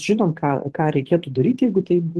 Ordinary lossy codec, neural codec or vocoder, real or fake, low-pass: Opus, 16 kbps; vocoder, 24 kHz, 100 mel bands, Vocos; fake; 10.8 kHz